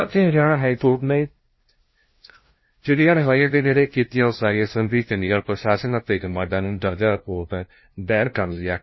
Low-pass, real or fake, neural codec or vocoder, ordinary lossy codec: 7.2 kHz; fake; codec, 16 kHz, 0.5 kbps, FunCodec, trained on LibriTTS, 25 frames a second; MP3, 24 kbps